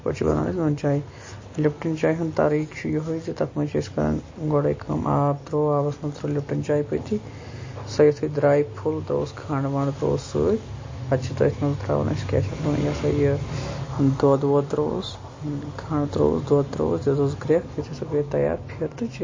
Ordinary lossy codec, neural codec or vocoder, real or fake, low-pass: MP3, 32 kbps; none; real; 7.2 kHz